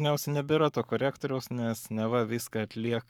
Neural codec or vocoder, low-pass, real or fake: codec, 44.1 kHz, 7.8 kbps, Pupu-Codec; 19.8 kHz; fake